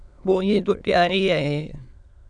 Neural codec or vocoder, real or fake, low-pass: autoencoder, 22.05 kHz, a latent of 192 numbers a frame, VITS, trained on many speakers; fake; 9.9 kHz